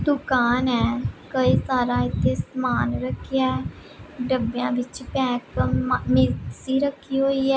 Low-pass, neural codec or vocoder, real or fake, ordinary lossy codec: none; none; real; none